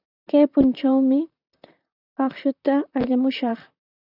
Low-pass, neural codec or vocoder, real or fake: 5.4 kHz; vocoder, 44.1 kHz, 128 mel bands, Pupu-Vocoder; fake